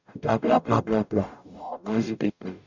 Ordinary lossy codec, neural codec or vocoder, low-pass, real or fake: none; codec, 44.1 kHz, 0.9 kbps, DAC; 7.2 kHz; fake